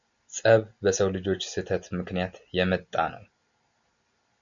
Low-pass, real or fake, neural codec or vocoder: 7.2 kHz; real; none